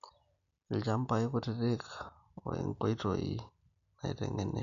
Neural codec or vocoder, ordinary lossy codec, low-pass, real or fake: none; none; 7.2 kHz; real